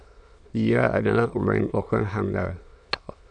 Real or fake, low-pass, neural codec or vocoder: fake; 9.9 kHz; autoencoder, 22.05 kHz, a latent of 192 numbers a frame, VITS, trained on many speakers